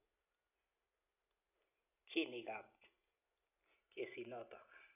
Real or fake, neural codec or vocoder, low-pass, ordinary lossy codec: real; none; 3.6 kHz; none